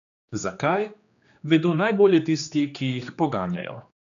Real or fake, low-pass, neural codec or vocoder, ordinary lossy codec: fake; 7.2 kHz; codec, 16 kHz, 2 kbps, X-Codec, HuBERT features, trained on general audio; none